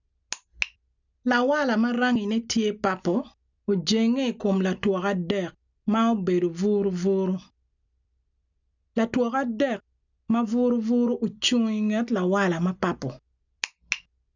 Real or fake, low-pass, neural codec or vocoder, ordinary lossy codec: real; 7.2 kHz; none; none